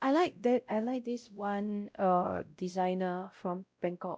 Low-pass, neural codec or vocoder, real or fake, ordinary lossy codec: none; codec, 16 kHz, 0.5 kbps, X-Codec, WavLM features, trained on Multilingual LibriSpeech; fake; none